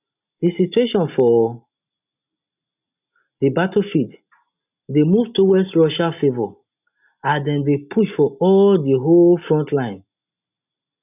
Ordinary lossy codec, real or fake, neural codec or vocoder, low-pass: none; real; none; 3.6 kHz